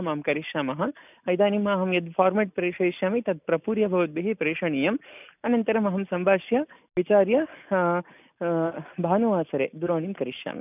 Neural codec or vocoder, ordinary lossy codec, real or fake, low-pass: none; none; real; 3.6 kHz